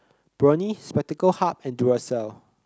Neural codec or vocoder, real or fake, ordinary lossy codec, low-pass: none; real; none; none